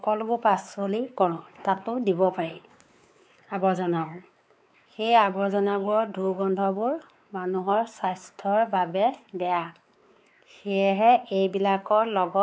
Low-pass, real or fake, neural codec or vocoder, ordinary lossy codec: none; fake; codec, 16 kHz, 4 kbps, X-Codec, WavLM features, trained on Multilingual LibriSpeech; none